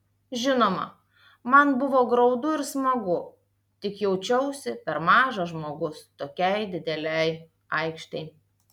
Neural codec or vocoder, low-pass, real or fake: none; 19.8 kHz; real